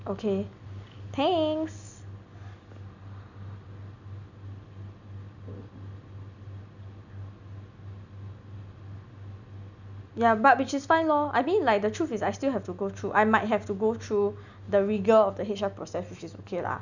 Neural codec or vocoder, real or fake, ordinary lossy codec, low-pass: none; real; none; 7.2 kHz